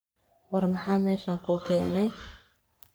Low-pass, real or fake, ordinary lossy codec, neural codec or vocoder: none; fake; none; codec, 44.1 kHz, 3.4 kbps, Pupu-Codec